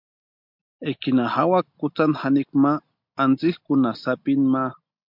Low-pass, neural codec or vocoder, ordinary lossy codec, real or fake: 5.4 kHz; none; MP3, 48 kbps; real